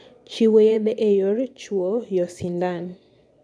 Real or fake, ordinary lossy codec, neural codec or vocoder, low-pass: fake; none; vocoder, 22.05 kHz, 80 mel bands, Vocos; none